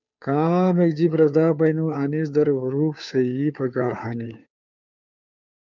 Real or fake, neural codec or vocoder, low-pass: fake; codec, 16 kHz, 2 kbps, FunCodec, trained on Chinese and English, 25 frames a second; 7.2 kHz